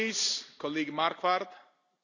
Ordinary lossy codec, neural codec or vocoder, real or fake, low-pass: none; none; real; 7.2 kHz